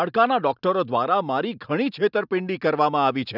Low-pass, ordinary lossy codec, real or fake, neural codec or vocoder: 5.4 kHz; none; real; none